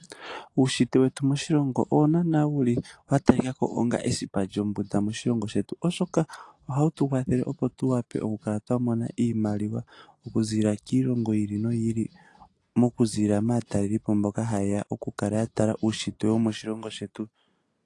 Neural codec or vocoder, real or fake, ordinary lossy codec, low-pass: none; real; AAC, 48 kbps; 10.8 kHz